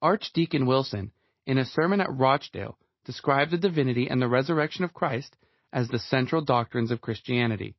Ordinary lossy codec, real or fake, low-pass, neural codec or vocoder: MP3, 24 kbps; real; 7.2 kHz; none